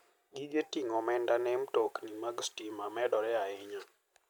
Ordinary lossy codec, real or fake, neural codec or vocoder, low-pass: none; real; none; none